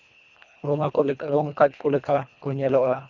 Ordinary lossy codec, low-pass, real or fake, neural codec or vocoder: none; 7.2 kHz; fake; codec, 24 kHz, 1.5 kbps, HILCodec